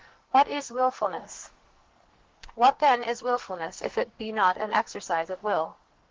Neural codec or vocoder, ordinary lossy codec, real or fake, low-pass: codec, 44.1 kHz, 2.6 kbps, SNAC; Opus, 16 kbps; fake; 7.2 kHz